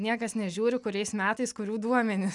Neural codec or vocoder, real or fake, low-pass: none; real; 10.8 kHz